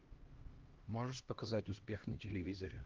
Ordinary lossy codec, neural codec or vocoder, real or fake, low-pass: Opus, 16 kbps; codec, 16 kHz, 1 kbps, X-Codec, HuBERT features, trained on LibriSpeech; fake; 7.2 kHz